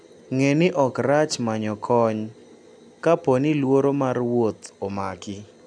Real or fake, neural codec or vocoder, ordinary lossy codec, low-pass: real; none; none; 9.9 kHz